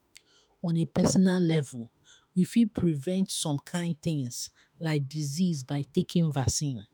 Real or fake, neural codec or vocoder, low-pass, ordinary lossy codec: fake; autoencoder, 48 kHz, 32 numbers a frame, DAC-VAE, trained on Japanese speech; none; none